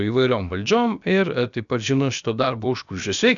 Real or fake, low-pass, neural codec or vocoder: fake; 7.2 kHz; codec, 16 kHz, about 1 kbps, DyCAST, with the encoder's durations